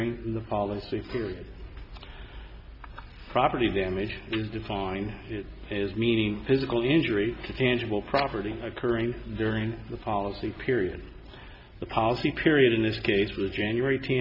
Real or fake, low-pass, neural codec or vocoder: real; 5.4 kHz; none